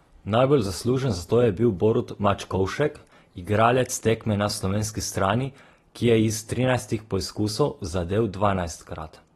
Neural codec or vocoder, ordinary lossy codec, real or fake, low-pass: none; AAC, 32 kbps; real; 19.8 kHz